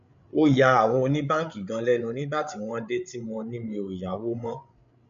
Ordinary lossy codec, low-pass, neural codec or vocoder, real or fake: none; 7.2 kHz; codec, 16 kHz, 8 kbps, FreqCodec, larger model; fake